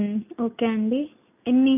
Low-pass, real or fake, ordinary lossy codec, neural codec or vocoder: 3.6 kHz; real; none; none